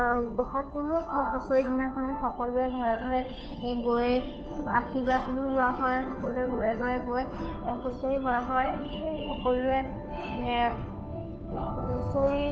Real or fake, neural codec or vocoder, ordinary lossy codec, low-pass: fake; codec, 16 kHz, 2 kbps, FunCodec, trained on Chinese and English, 25 frames a second; none; none